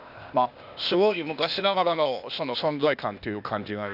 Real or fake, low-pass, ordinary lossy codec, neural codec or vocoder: fake; 5.4 kHz; none; codec, 16 kHz, 0.8 kbps, ZipCodec